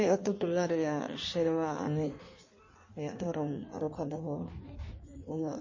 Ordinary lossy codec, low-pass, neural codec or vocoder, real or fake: MP3, 32 kbps; 7.2 kHz; codec, 16 kHz in and 24 kHz out, 1.1 kbps, FireRedTTS-2 codec; fake